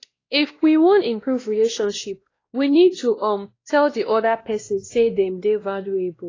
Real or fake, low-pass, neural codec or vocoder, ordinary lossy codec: fake; 7.2 kHz; codec, 16 kHz, 1 kbps, X-Codec, WavLM features, trained on Multilingual LibriSpeech; AAC, 32 kbps